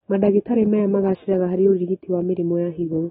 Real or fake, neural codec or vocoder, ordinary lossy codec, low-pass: real; none; AAC, 16 kbps; 7.2 kHz